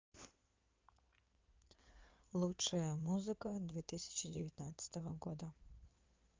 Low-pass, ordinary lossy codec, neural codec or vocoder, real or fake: 7.2 kHz; Opus, 32 kbps; codec, 16 kHz in and 24 kHz out, 2.2 kbps, FireRedTTS-2 codec; fake